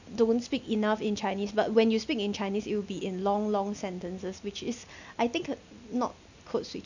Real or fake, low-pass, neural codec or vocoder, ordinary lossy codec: real; 7.2 kHz; none; none